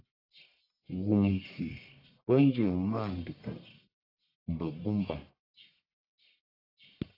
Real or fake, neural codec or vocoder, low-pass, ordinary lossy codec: fake; codec, 44.1 kHz, 1.7 kbps, Pupu-Codec; 5.4 kHz; AAC, 32 kbps